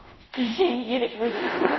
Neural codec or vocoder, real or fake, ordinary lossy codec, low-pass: codec, 24 kHz, 0.5 kbps, DualCodec; fake; MP3, 24 kbps; 7.2 kHz